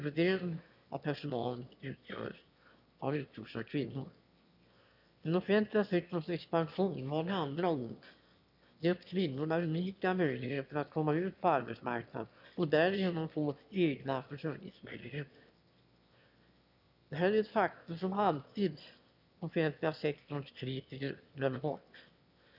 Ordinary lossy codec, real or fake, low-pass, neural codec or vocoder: none; fake; 5.4 kHz; autoencoder, 22.05 kHz, a latent of 192 numbers a frame, VITS, trained on one speaker